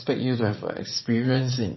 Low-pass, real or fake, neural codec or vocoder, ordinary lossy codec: 7.2 kHz; fake; vocoder, 44.1 kHz, 80 mel bands, Vocos; MP3, 24 kbps